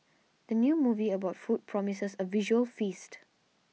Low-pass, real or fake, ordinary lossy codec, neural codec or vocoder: none; real; none; none